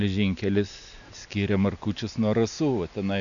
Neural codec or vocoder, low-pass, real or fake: none; 7.2 kHz; real